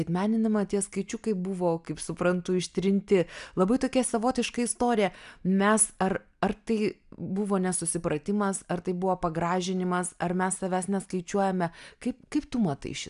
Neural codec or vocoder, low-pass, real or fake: none; 10.8 kHz; real